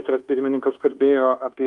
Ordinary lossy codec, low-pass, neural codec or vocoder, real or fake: Opus, 24 kbps; 10.8 kHz; codec, 24 kHz, 1.2 kbps, DualCodec; fake